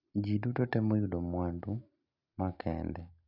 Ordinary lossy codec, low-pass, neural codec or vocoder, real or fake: none; 5.4 kHz; none; real